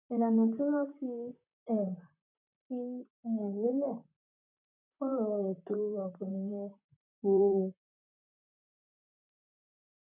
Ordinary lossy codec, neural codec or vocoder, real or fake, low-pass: none; vocoder, 44.1 kHz, 128 mel bands, Pupu-Vocoder; fake; 3.6 kHz